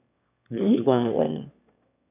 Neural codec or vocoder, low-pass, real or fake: autoencoder, 22.05 kHz, a latent of 192 numbers a frame, VITS, trained on one speaker; 3.6 kHz; fake